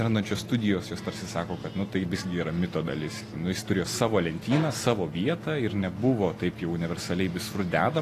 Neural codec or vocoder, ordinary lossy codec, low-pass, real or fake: none; AAC, 48 kbps; 14.4 kHz; real